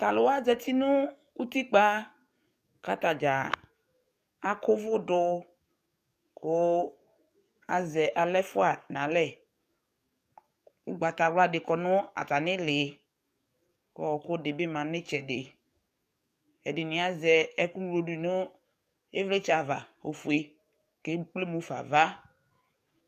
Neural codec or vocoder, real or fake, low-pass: codec, 44.1 kHz, 7.8 kbps, DAC; fake; 14.4 kHz